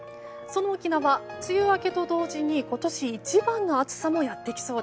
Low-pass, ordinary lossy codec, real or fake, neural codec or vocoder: none; none; real; none